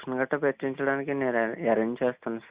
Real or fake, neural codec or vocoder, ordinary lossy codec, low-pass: real; none; Opus, 64 kbps; 3.6 kHz